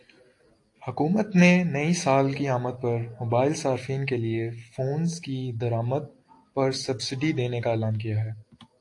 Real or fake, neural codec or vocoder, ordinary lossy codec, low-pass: real; none; AAC, 48 kbps; 10.8 kHz